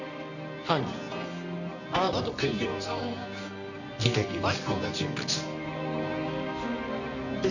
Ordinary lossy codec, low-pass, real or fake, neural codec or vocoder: none; 7.2 kHz; fake; codec, 24 kHz, 0.9 kbps, WavTokenizer, medium music audio release